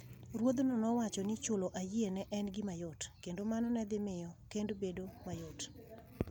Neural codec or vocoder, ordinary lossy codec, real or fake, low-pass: none; none; real; none